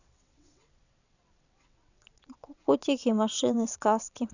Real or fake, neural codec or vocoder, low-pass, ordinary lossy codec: fake; vocoder, 22.05 kHz, 80 mel bands, WaveNeXt; 7.2 kHz; none